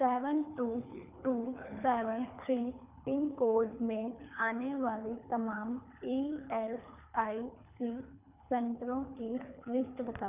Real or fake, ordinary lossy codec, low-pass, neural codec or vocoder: fake; none; 3.6 kHz; codec, 24 kHz, 3 kbps, HILCodec